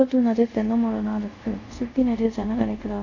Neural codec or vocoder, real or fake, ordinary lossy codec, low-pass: codec, 24 kHz, 0.5 kbps, DualCodec; fake; none; 7.2 kHz